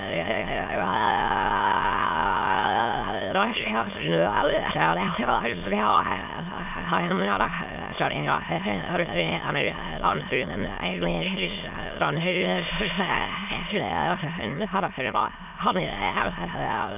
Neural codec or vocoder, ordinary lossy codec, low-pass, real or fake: autoencoder, 22.05 kHz, a latent of 192 numbers a frame, VITS, trained on many speakers; none; 3.6 kHz; fake